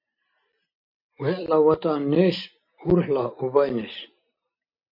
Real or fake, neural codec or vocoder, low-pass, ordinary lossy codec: real; none; 5.4 kHz; MP3, 32 kbps